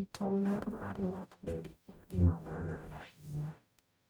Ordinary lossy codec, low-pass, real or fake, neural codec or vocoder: none; none; fake; codec, 44.1 kHz, 0.9 kbps, DAC